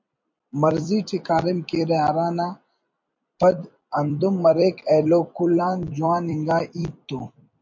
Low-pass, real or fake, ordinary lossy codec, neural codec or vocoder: 7.2 kHz; fake; MP3, 48 kbps; vocoder, 44.1 kHz, 128 mel bands every 256 samples, BigVGAN v2